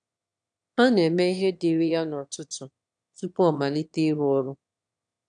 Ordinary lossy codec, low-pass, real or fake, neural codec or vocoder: none; 9.9 kHz; fake; autoencoder, 22.05 kHz, a latent of 192 numbers a frame, VITS, trained on one speaker